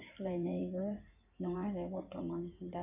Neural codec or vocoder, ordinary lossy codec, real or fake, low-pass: codec, 16 kHz in and 24 kHz out, 2.2 kbps, FireRedTTS-2 codec; none; fake; 3.6 kHz